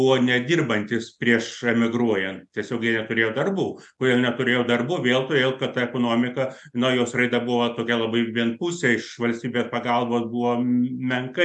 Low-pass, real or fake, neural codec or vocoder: 10.8 kHz; real; none